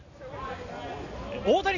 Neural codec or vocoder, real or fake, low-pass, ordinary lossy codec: none; real; 7.2 kHz; none